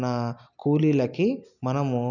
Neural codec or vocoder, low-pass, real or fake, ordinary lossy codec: none; 7.2 kHz; real; none